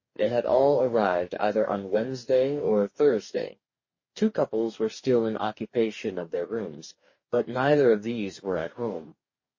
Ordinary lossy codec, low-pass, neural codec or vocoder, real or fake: MP3, 32 kbps; 7.2 kHz; codec, 44.1 kHz, 2.6 kbps, DAC; fake